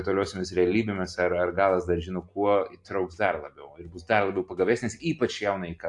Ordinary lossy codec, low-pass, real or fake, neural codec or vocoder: AAC, 64 kbps; 10.8 kHz; real; none